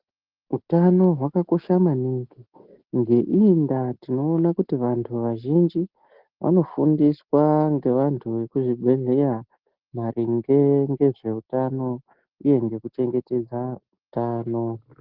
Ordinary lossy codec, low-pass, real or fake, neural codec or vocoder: Opus, 16 kbps; 5.4 kHz; real; none